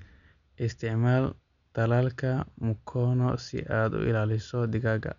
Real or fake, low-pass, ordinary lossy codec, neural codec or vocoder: real; 7.2 kHz; none; none